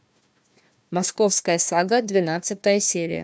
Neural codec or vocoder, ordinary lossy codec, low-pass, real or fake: codec, 16 kHz, 1 kbps, FunCodec, trained on Chinese and English, 50 frames a second; none; none; fake